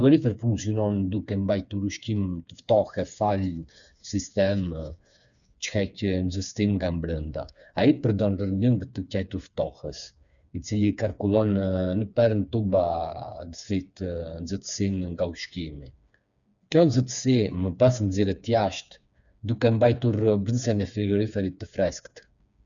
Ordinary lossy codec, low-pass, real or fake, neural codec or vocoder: none; 7.2 kHz; fake; codec, 16 kHz, 4 kbps, FreqCodec, smaller model